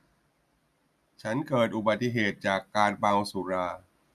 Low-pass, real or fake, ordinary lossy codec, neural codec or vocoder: 14.4 kHz; real; MP3, 96 kbps; none